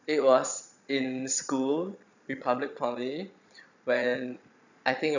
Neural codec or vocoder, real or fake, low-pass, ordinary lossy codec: vocoder, 22.05 kHz, 80 mel bands, Vocos; fake; 7.2 kHz; none